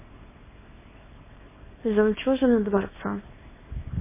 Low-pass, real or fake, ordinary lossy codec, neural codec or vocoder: 3.6 kHz; fake; MP3, 16 kbps; codec, 24 kHz, 0.9 kbps, WavTokenizer, small release